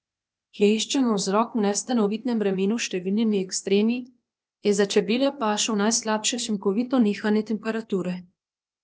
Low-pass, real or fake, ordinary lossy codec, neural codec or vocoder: none; fake; none; codec, 16 kHz, 0.8 kbps, ZipCodec